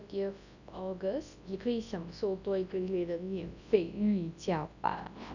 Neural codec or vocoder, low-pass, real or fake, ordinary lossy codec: codec, 24 kHz, 0.9 kbps, WavTokenizer, large speech release; 7.2 kHz; fake; none